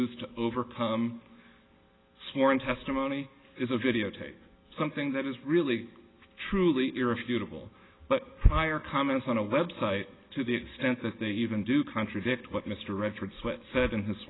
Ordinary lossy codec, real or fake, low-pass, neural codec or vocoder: AAC, 16 kbps; real; 7.2 kHz; none